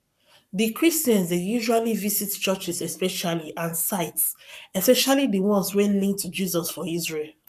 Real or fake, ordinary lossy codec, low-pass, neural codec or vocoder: fake; none; 14.4 kHz; codec, 44.1 kHz, 7.8 kbps, Pupu-Codec